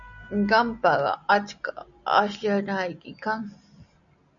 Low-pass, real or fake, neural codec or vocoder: 7.2 kHz; real; none